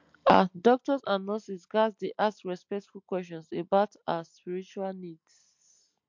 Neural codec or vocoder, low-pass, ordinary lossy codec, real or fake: none; 7.2 kHz; MP3, 48 kbps; real